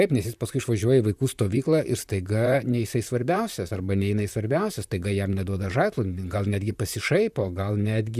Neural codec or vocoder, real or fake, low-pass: vocoder, 44.1 kHz, 128 mel bands, Pupu-Vocoder; fake; 14.4 kHz